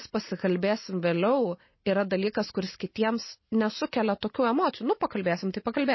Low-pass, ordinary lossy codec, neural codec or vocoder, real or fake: 7.2 kHz; MP3, 24 kbps; none; real